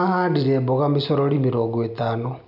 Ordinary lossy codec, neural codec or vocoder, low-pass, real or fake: none; none; 5.4 kHz; real